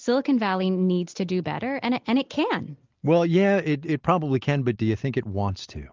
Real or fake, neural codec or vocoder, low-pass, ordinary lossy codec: real; none; 7.2 kHz; Opus, 32 kbps